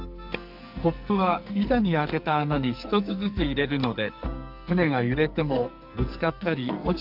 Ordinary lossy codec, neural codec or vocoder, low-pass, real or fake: none; codec, 44.1 kHz, 2.6 kbps, SNAC; 5.4 kHz; fake